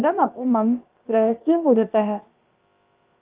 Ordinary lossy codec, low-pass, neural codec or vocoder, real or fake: Opus, 32 kbps; 3.6 kHz; codec, 16 kHz, about 1 kbps, DyCAST, with the encoder's durations; fake